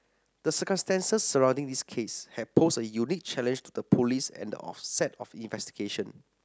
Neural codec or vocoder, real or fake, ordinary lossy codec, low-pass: none; real; none; none